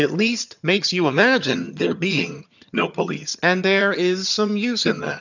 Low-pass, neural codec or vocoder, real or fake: 7.2 kHz; vocoder, 22.05 kHz, 80 mel bands, HiFi-GAN; fake